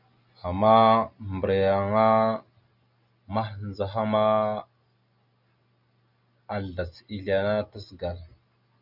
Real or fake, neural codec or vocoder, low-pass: real; none; 5.4 kHz